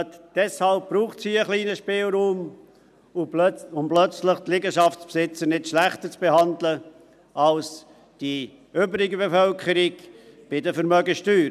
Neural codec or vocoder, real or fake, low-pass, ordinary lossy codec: none; real; 14.4 kHz; none